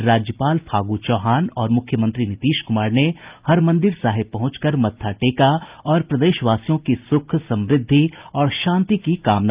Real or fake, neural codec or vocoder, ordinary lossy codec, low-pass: real; none; Opus, 24 kbps; 3.6 kHz